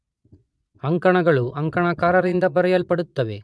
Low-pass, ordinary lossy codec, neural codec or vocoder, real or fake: none; none; vocoder, 22.05 kHz, 80 mel bands, Vocos; fake